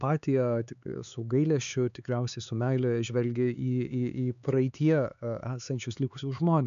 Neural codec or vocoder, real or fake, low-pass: codec, 16 kHz, 4 kbps, X-Codec, HuBERT features, trained on LibriSpeech; fake; 7.2 kHz